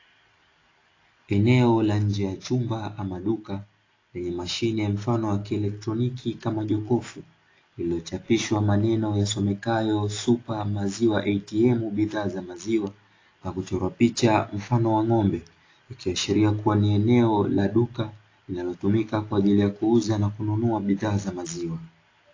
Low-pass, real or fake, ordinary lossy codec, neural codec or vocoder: 7.2 kHz; real; AAC, 32 kbps; none